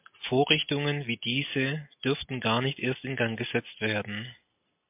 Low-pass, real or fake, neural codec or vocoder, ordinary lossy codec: 3.6 kHz; real; none; MP3, 32 kbps